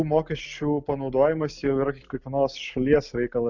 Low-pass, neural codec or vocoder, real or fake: 7.2 kHz; none; real